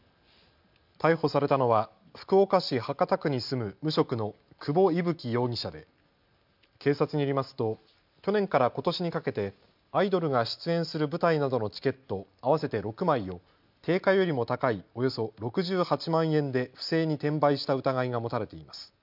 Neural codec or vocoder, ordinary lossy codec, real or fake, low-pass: none; MP3, 48 kbps; real; 5.4 kHz